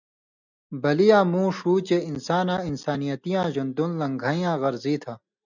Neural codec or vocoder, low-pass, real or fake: none; 7.2 kHz; real